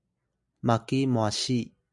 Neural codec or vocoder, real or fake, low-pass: none; real; 10.8 kHz